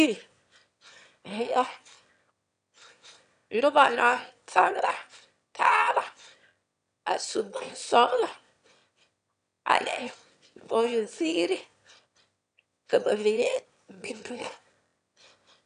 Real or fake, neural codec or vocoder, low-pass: fake; autoencoder, 22.05 kHz, a latent of 192 numbers a frame, VITS, trained on one speaker; 9.9 kHz